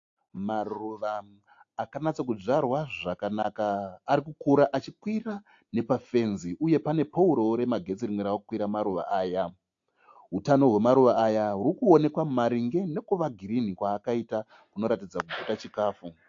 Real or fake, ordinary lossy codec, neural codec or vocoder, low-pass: real; AAC, 48 kbps; none; 7.2 kHz